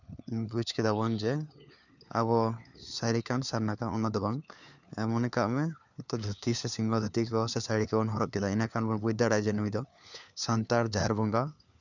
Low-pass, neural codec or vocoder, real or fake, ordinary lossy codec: 7.2 kHz; codec, 16 kHz, 4 kbps, FunCodec, trained on LibriTTS, 50 frames a second; fake; none